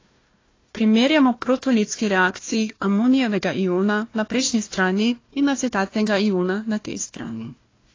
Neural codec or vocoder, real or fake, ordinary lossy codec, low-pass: codec, 16 kHz, 1 kbps, FunCodec, trained on Chinese and English, 50 frames a second; fake; AAC, 32 kbps; 7.2 kHz